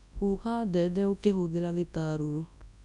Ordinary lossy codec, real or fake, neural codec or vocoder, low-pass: none; fake; codec, 24 kHz, 0.9 kbps, WavTokenizer, large speech release; 10.8 kHz